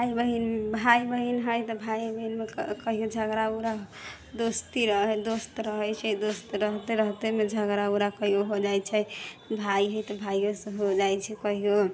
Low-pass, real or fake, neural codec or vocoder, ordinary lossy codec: none; real; none; none